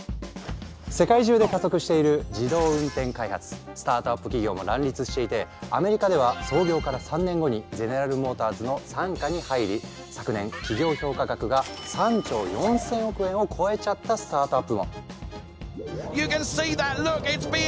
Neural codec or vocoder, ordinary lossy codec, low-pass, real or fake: none; none; none; real